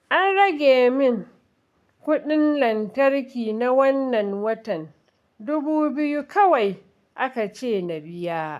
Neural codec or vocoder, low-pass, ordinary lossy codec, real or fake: codec, 44.1 kHz, 7.8 kbps, Pupu-Codec; 14.4 kHz; none; fake